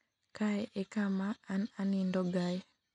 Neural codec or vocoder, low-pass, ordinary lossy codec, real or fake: none; 10.8 kHz; none; real